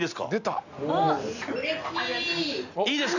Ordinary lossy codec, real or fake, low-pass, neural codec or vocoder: none; real; 7.2 kHz; none